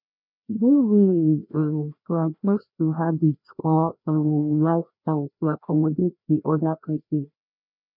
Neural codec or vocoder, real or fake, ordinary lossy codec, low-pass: codec, 16 kHz, 1 kbps, FreqCodec, larger model; fake; none; 5.4 kHz